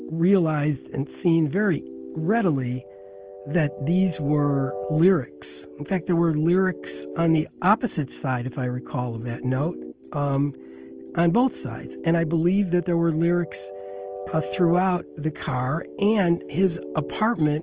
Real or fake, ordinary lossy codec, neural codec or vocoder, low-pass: real; Opus, 16 kbps; none; 3.6 kHz